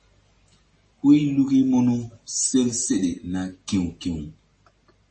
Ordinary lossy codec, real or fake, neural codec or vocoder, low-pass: MP3, 32 kbps; real; none; 10.8 kHz